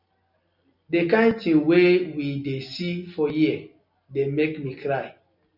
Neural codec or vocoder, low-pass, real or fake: none; 5.4 kHz; real